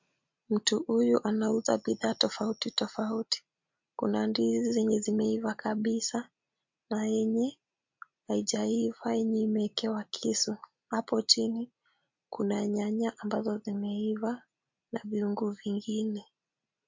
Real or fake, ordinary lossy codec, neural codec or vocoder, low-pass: real; MP3, 48 kbps; none; 7.2 kHz